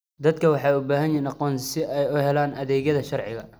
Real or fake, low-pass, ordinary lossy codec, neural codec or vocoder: real; none; none; none